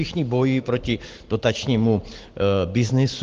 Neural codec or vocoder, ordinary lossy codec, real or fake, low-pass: none; Opus, 16 kbps; real; 7.2 kHz